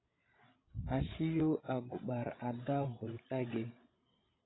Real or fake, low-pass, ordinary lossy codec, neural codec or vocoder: fake; 7.2 kHz; AAC, 16 kbps; codec, 16 kHz, 16 kbps, FreqCodec, larger model